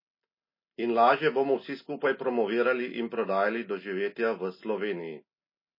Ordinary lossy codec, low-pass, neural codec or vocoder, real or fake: MP3, 24 kbps; 5.4 kHz; none; real